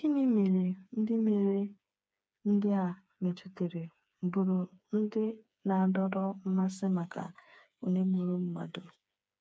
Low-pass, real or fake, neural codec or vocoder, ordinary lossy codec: none; fake; codec, 16 kHz, 4 kbps, FreqCodec, smaller model; none